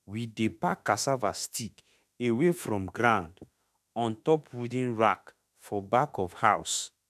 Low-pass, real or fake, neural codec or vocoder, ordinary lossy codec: 14.4 kHz; fake; autoencoder, 48 kHz, 32 numbers a frame, DAC-VAE, trained on Japanese speech; none